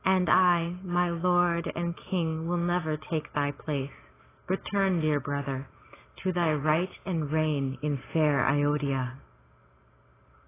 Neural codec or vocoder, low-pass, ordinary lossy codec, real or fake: none; 3.6 kHz; AAC, 16 kbps; real